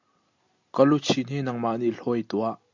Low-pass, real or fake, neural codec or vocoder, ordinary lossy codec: 7.2 kHz; real; none; MP3, 64 kbps